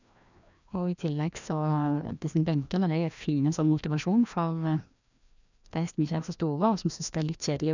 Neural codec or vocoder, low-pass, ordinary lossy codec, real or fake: codec, 16 kHz, 1 kbps, FreqCodec, larger model; 7.2 kHz; none; fake